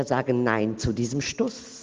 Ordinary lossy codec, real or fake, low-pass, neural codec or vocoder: Opus, 16 kbps; real; 7.2 kHz; none